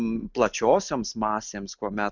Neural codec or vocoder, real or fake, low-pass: none; real; 7.2 kHz